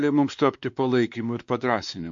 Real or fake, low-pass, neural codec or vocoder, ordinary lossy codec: fake; 7.2 kHz; codec, 16 kHz, 2 kbps, X-Codec, WavLM features, trained on Multilingual LibriSpeech; MP3, 48 kbps